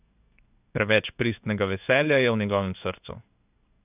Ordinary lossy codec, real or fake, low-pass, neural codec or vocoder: none; fake; 3.6 kHz; codec, 16 kHz in and 24 kHz out, 1 kbps, XY-Tokenizer